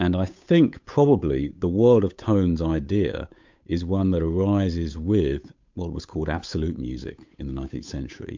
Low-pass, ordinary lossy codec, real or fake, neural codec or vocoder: 7.2 kHz; MP3, 64 kbps; fake; codec, 16 kHz, 8 kbps, FunCodec, trained on Chinese and English, 25 frames a second